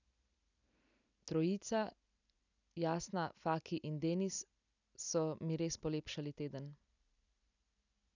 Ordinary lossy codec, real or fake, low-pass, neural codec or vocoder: none; real; 7.2 kHz; none